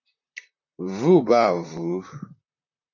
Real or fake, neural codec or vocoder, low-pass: fake; vocoder, 44.1 kHz, 128 mel bands, Pupu-Vocoder; 7.2 kHz